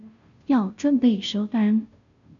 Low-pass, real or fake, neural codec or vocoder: 7.2 kHz; fake; codec, 16 kHz, 0.5 kbps, FunCodec, trained on Chinese and English, 25 frames a second